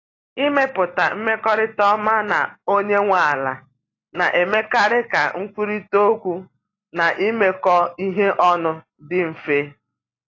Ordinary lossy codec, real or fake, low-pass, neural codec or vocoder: AAC, 32 kbps; real; 7.2 kHz; none